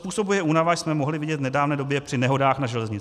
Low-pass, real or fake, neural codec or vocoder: 14.4 kHz; real; none